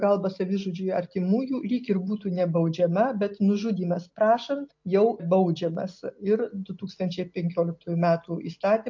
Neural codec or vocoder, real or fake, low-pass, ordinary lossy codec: none; real; 7.2 kHz; MP3, 48 kbps